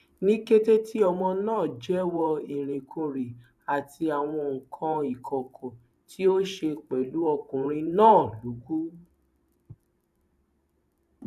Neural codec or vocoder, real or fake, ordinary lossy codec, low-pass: vocoder, 44.1 kHz, 128 mel bands every 512 samples, BigVGAN v2; fake; none; 14.4 kHz